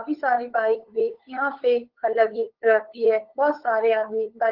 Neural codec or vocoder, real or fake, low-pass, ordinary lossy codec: codec, 16 kHz, 8 kbps, FunCodec, trained on LibriTTS, 25 frames a second; fake; 5.4 kHz; Opus, 16 kbps